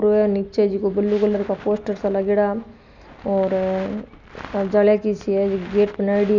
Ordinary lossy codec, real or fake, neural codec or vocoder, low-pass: none; real; none; 7.2 kHz